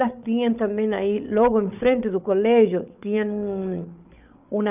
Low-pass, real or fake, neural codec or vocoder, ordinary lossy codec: 3.6 kHz; fake; codec, 16 kHz, 8 kbps, FreqCodec, larger model; none